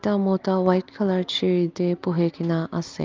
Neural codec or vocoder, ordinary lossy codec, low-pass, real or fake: none; Opus, 32 kbps; 7.2 kHz; real